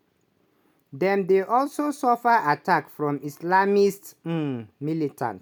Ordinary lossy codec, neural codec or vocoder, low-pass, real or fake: none; none; none; real